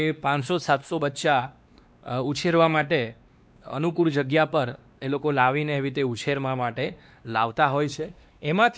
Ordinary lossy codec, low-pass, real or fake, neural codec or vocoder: none; none; fake; codec, 16 kHz, 2 kbps, X-Codec, WavLM features, trained on Multilingual LibriSpeech